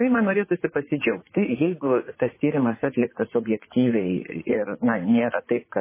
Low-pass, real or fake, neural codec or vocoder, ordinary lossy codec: 3.6 kHz; fake; vocoder, 44.1 kHz, 80 mel bands, Vocos; MP3, 16 kbps